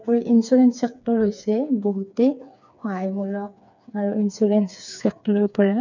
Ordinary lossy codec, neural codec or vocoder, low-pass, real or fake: none; codec, 16 kHz, 4 kbps, FreqCodec, smaller model; 7.2 kHz; fake